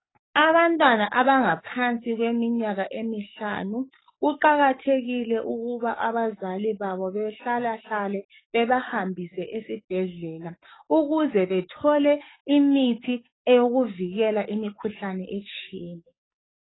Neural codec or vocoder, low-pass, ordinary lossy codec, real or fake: codec, 44.1 kHz, 7.8 kbps, DAC; 7.2 kHz; AAC, 16 kbps; fake